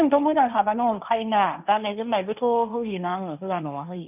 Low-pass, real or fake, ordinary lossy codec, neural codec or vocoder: 3.6 kHz; fake; none; codec, 16 kHz, 1.1 kbps, Voila-Tokenizer